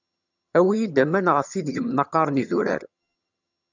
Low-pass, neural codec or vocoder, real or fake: 7.2 kHz; vocoder, 22.05 kHz, 80 mel bands, HiFi-GAN; fake